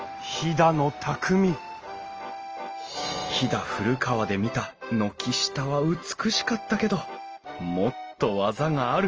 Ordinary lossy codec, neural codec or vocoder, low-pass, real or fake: Opus, 24 kbps; none; 7.2 kHz; real